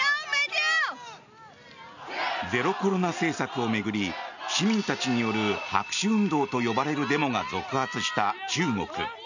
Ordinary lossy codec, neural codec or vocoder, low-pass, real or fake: none; none; 7.2 kHz; real